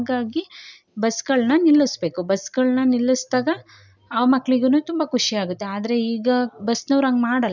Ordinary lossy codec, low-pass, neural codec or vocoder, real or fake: none; 7.2 kHz; none; real